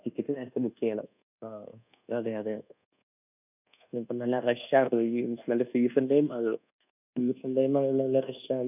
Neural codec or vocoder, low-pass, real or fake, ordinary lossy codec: codec, 24 kHz, 1.2 kbps, DualCodec; 3.6 kHz; fake; none